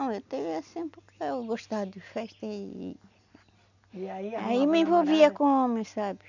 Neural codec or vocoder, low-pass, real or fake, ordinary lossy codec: none; 7.2 kHz; real; none